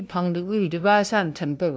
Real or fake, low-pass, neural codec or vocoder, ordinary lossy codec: fake; none; codec, 16 kHz, 0.5 kbps, FunCodec, trained on LibriTTS, 25 frames a second; none